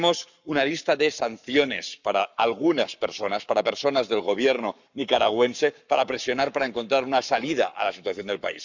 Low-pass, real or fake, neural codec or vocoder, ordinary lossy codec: 7.2 kHz; fake; codec, 44.1 kHz, 7.8 kbps, Pupu-Codec; none